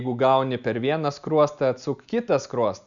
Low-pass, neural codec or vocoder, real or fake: 7.2 kHz; none; real